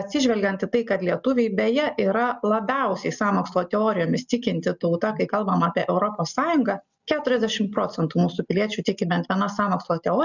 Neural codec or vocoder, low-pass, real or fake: none; 7.2 kHz; real